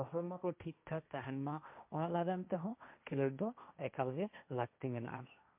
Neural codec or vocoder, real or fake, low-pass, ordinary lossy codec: codec, 16 kHz, 1.1 kbps, Voila-Tokenizer; fake; 3.6 kHz; MP3, 32 kbps